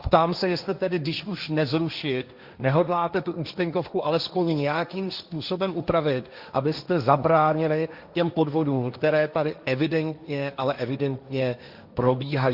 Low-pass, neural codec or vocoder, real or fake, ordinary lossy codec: 5.4 kHz; codec, 16 kHz, 1.1 kbps, Voila-Tokenizer; fake; Opus, 64 kbps